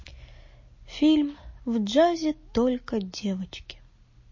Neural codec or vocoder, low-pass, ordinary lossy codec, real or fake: none; 7.2 kHz; MP3, 32 kbps; real